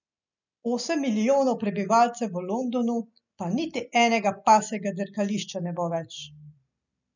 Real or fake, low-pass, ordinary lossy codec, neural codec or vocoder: real; 7.2 kHz; none; none